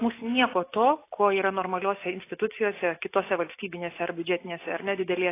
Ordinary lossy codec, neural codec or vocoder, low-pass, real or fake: AAC, 24 kbps; none; 3.6 kHz; real